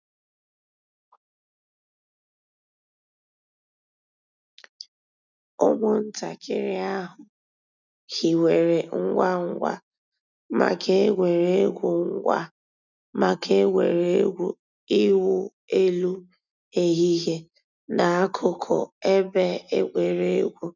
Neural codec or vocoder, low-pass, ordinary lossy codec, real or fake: none; 7.2 kHz; none; real